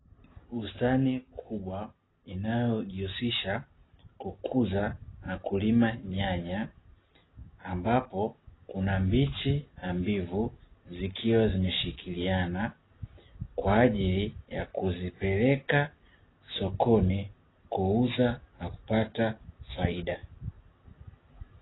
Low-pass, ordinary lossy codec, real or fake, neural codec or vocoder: 7.2 kHz; AAC, 16 kbps; real; none